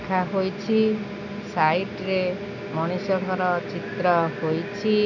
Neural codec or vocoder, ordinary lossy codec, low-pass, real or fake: none; none; 7.2 kHz; real